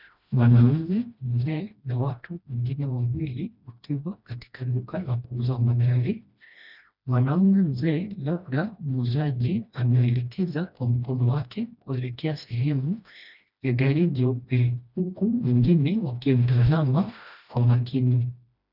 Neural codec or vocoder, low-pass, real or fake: codec, 16 kHz, 1 kbps, FreqCodec, smaller model; 5.4 kHz; fake